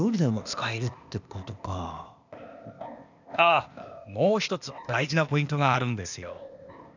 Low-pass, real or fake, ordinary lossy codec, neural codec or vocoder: 7.2 kHz; fake; none; codec, 16 kHz, 0.8 kbps, ZipCodec